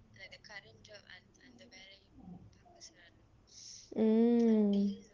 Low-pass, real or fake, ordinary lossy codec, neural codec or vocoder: 7.2 kHz; real; Opus, 16 kbps; none